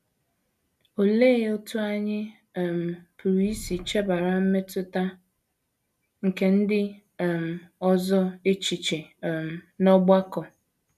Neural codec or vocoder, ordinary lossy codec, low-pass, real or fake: none; none; 14.4 kHz; real